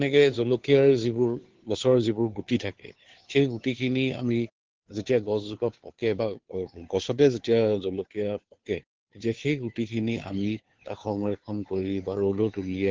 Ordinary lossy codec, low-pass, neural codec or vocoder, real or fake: Opus, 16 kbps; 7.2 kHz; codec, 16 kHz, 2 kbps, FunCodec, trained on Chinese and English, 25 frames a second; fake